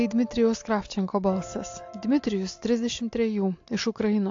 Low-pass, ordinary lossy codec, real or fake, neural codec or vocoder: 7.2 kHz; AAC, 48 kbps; real; none